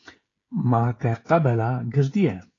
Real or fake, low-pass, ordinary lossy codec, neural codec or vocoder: fake; 7.2 kHz; AAC, 32 kbps; codec, 16 kHz, 16 kbps, FreqCodec, smaller model